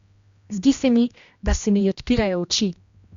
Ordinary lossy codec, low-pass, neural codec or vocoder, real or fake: Opus, 64 kbps; 7.2 kHz; codec, 16 kHz, 1 kbps, X-Codec, HuBERT features, trained on general audio; fake